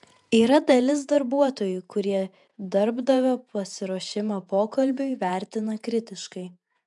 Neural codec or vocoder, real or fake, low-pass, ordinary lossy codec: vocoder, 48 kHz, 128 mel bands, Vocos; fake; 10.8 kHz; MP3, 96 kbps